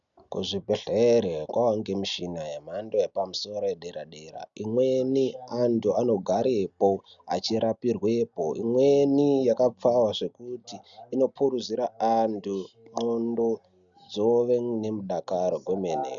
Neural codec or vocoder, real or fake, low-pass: none; real; 7.2 kHz